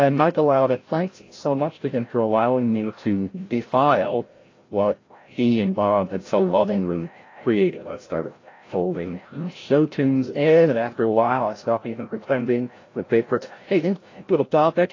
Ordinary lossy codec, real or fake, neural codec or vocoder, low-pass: AAC, 32 kbps; fake; codec, 16 kHz, 0.5 kbps, FreqCodec, larger model; 7.2 kHz